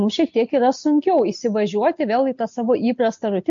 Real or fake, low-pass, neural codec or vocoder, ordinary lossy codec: real; 7.2 kHz; none; MP3, 48 kbps